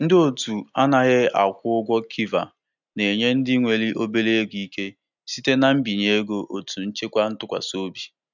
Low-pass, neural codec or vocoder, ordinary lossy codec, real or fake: 7.2 kHz; none; none; real